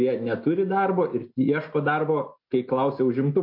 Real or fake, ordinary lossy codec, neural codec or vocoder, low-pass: real; MP3, 32 kbps; none; 5.4 kHz